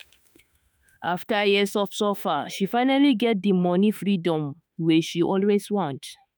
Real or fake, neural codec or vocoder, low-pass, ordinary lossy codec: fake; autoencoder, 48 kHz, 32 numbers a frame, DAC-VAE, trained on Japanese speech; none; none